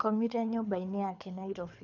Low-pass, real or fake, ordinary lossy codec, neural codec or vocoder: 7.2 kHz; fake; MP3, 64 kbps; codec, 24 kHz, 6 kbps, HILCodec